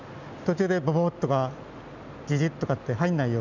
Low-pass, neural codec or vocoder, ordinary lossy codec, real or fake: 7.2 kHz; none; none; real